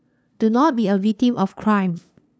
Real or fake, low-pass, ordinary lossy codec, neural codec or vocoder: fake; none; none; codec, 16 kHz, 2 kbps, FunCodec, trained on LibriTTS, 25 frames a second